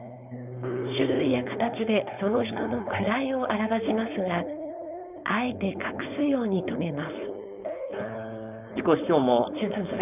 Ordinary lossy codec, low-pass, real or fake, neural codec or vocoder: none; 3.6 kHz; fake; codec, 16 kHz, 4.8 kbps, FACodec